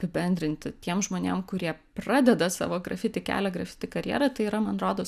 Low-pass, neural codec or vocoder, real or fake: 14.4 kHz; none; real